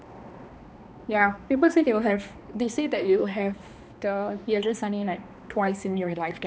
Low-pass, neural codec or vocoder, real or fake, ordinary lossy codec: none; codec, 16 kHz, 2 kbps, X-Codec, HuBERT features, trained on balanced general audio; fake; none